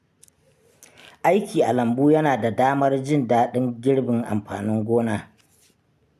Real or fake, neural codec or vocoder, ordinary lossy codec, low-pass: real; none; MP3, 96 kbps; 14.4 kHz